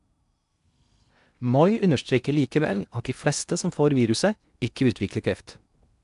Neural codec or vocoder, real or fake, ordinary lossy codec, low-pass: codec, 16 kHz in and 24 kHz out, 0.8 kbps, FocalCodec, streaming, 65536 codes; fake; AAC, 96 kbps; 10.8 kHz